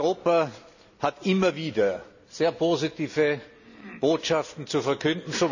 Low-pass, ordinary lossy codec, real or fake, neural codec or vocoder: 7.2 kHz; none; real; none